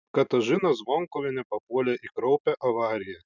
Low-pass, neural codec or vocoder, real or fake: 7.2 kHz; none; real